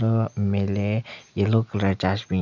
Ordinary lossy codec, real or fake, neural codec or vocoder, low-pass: none; real; none; 7.2 kHz